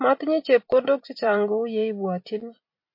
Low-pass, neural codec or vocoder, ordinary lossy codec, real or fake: 5.4 kHz; vocoder, 44.1 kHz, 128 mel bands every 256 samples, BigVGAN v2; MP3, 32 kbps; fake